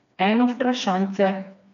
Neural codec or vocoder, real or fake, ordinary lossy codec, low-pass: codec, 16 kHz, 2 kbps, FreqCodec, smaller model; fake; MP3, 64 kbps; 7.2 kHz